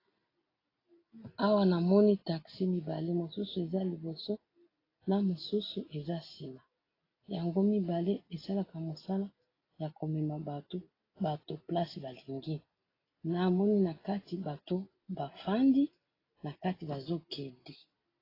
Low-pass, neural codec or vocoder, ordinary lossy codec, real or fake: 5.4 kHz; none; AAC, 24 kbps; real